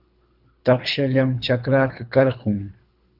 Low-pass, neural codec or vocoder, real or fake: 5.4 kHz; codec, 24 kHz, 3 kbps, HILCodec; fake